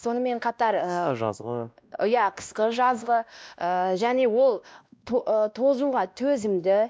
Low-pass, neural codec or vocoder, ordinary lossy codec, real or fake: none; codec, 16 kHz, 1 kbps, X-Codec, WavLM features, trained on Multilingual LibriSpeech; none; fake